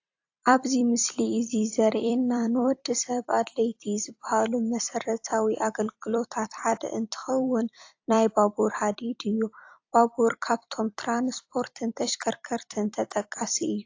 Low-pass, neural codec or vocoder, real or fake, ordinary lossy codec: 7.2 kHz; vocoder, 44.1 kHz, 128 mel bands every 256 samples, BigVGAN v2; fake; AAC, 48 kbps